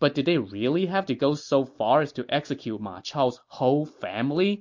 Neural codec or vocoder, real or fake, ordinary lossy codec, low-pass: none; real; MP3, 48 kbps; 7.2 kHz